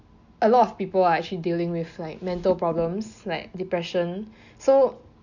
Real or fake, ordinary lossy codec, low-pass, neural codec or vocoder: real; none; 7.2 kHz; none